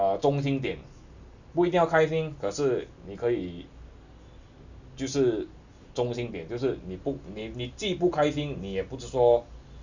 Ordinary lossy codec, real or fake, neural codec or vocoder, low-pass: none; real; none; 7.2 kHz